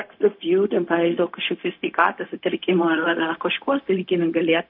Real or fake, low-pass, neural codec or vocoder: fake; 5.4 kHz; codec, 16 kHz, 0.4 kbps, LongCat-Audio-Codec